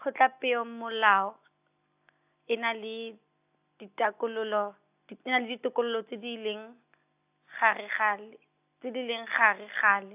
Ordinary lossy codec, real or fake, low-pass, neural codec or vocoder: AAC, 32 kbps; real; 3.6 kHz; none